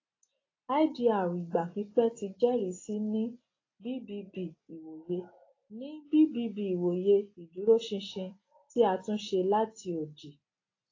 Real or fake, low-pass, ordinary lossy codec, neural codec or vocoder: real; 7.2 kHz; AAC, 32 kbps; none